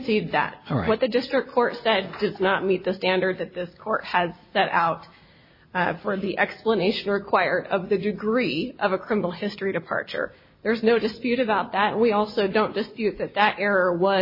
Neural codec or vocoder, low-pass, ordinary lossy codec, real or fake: none; 5.4 kHz; MP3, 24 kbps; real